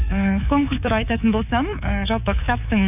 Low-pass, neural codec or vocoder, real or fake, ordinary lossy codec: 3.6 kHz; codec, 24 kHz, 3.1 kbps, DualCodec; fake; none